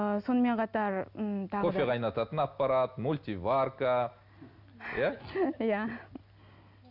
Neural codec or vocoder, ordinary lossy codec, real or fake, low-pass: none; none; real; 5.4 kHz